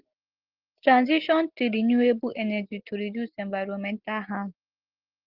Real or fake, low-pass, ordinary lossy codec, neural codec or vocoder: real; 5.4 kHz; Opus, 16 kbps; none